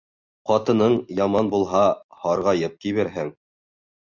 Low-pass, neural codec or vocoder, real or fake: 7.2 kHz; none; real